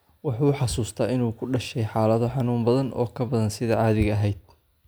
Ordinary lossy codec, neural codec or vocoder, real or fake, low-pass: none; none; real; none